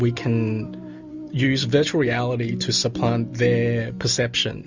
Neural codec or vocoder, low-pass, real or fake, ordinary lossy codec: none; 7.2 kHz; real; Opus, 64 kbps